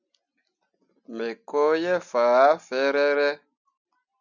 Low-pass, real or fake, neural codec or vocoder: 7.2 kHz; real; none